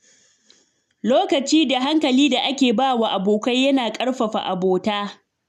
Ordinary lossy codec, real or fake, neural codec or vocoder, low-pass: none; real; none; 14.4 kHz